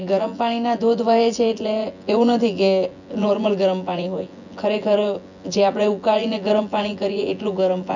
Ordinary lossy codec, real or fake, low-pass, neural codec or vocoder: none; fake; 7.2 kHz; vocoder, 24 kHz, 100 mel bands, Vocos